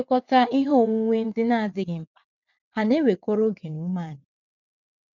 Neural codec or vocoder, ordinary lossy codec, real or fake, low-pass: vocoder, 22.05 kHz, 80 mel bands, WaveNeXt; none; fake; 7.2 kHz